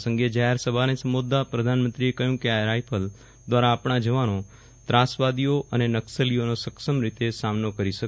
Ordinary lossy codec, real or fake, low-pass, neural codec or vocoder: none; real; none; none